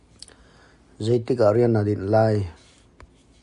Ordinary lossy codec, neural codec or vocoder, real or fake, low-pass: MP3, 96 kbps; none; real; 10.8 kHz